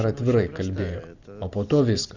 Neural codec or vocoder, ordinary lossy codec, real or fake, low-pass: none; Opus, 64 kbps; real; 7.2 kHz